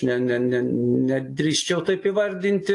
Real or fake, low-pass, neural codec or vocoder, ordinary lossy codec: real; 10.8 kHz; none; AAC, 48 kbps